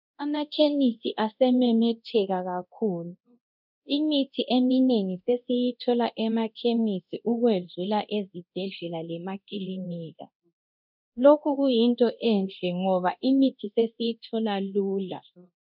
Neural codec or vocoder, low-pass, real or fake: codec, 24 kHz, 0.9 kbps, DualCodec; 5.4 kHz; fake